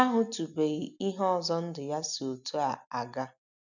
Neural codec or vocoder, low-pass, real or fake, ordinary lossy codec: none; 7.2 kHz; real; none